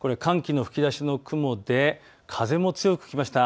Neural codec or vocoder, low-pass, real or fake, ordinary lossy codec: none; none; real; none